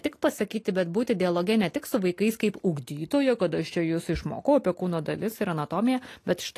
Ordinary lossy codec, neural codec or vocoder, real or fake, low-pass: AAC, 48 kbps; none; real; 14.4 kHz